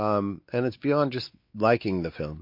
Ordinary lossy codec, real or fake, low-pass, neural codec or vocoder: MP3, 32 kbps; fake; 5.4 kHz; codec, 16 kHz, 4 kbps, X-Codec, WavLM features, trained on Multilingual LibriSpeech